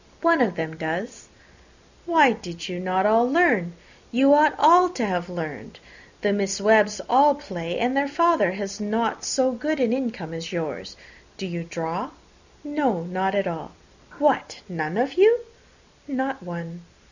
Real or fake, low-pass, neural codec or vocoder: real; 7.2 kHz; none